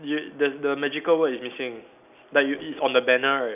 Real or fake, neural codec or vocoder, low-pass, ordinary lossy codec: real; none; 3.6 kHz; none